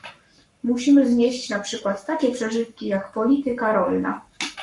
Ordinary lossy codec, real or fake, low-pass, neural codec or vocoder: Opus, 64 kbps; fake; 10.8 kHz; codec, 44.1 kHz, 7.8 kbps, Pupu-Codec